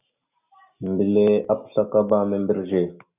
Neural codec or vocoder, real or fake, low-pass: none; real; 3.6 kHz